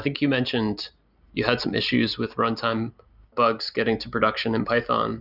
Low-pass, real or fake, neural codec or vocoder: 5.4 kHz; real; none